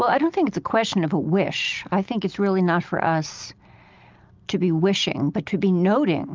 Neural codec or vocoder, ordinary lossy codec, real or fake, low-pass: none; Opus, 24 kbps; real; 7.2 kHz